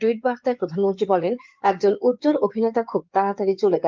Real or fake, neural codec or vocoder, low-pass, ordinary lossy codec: fake; codec, 16 kHz in and 24 kHz out, 2.2 kbps, FireRedTTS-2 codec; 7.2 kHz; Opus, 32 kbps